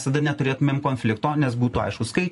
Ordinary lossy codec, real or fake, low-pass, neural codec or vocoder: MP3, 48 kbps; fake; 14.4 kHz; vocoder, 44.1 kHz, 128 mel bands every 512 samples, BigVGAN v2